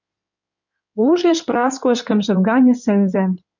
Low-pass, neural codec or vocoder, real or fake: 7.2 kHz; codec, 16 kHz in and 24 kHz out, 2.2 kbps, FireRedTTS-2 codec; fake